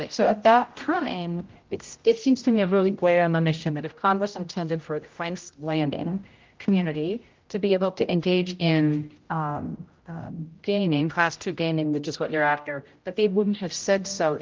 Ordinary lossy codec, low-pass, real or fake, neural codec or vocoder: Opus, 32 kbps; 7.2 kHz; fake; codec, 16 kHz, 0.5 kbps, X-Codec, HuBERT features, trained on general audio